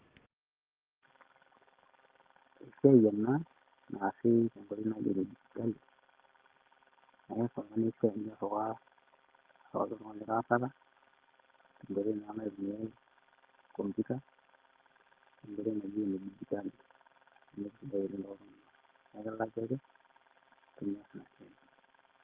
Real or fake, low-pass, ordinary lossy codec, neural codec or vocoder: real; 3.6 kHz; Opus, 16 kbps; none